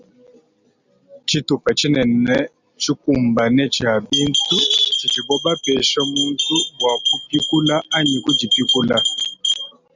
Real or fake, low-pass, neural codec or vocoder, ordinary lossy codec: real; 7.2 kHz; none; Opus, 64 kbps